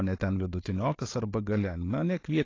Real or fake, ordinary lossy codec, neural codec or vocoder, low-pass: real; AAC, 32 kbps; none; 7.2 kHz